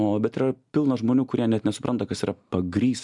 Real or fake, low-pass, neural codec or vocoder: real; 10.8 kHz; none